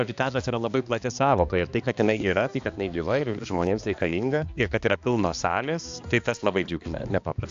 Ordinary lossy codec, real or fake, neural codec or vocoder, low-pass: AAC, 64 kbps; fake; codec, 16 kHz, 2 kbps, X-Codec, HuBERT features, trained on balanced general audio; 7.2 kHz